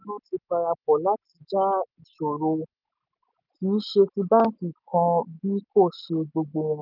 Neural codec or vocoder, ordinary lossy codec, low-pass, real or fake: none; none; 5.4 kHz; real